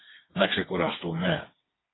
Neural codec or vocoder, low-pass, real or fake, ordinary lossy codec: codec, 16 kHz, 4 kbps, FreqCodec, smaller model; 7.2 kHz; fake; AAC, 16 kbps